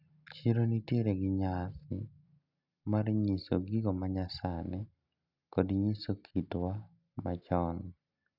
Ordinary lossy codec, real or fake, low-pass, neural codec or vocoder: none; real; 5.4 kHz; none